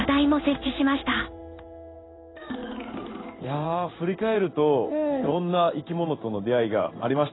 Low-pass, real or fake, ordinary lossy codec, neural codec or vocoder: 7.2 kHz; fake; AAC, 16 kbps; codec, 16 kHz in and 24 kHz out, 1 kbps, XY-Tokenizer